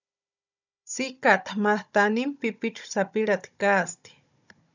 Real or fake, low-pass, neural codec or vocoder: fake; 7.2 kHz; codec, 16 kHz, 16 kbps, FunCodec, trained on Chinese and English, 50 frames a second